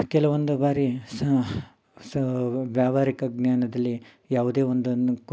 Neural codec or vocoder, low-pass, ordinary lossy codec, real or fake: none; none; none; real